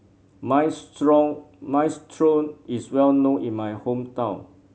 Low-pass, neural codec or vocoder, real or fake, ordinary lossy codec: none; none; real; none